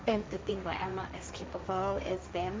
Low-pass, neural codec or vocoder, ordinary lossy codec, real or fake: none; codec, 16 kHz, 1.1 kbps, Voila-Tokenizer; none; fake